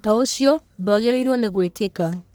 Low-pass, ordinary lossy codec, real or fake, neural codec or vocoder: none; none; fake; codec, 44.1 kHz, 1.7 kbps, Pupu-Codec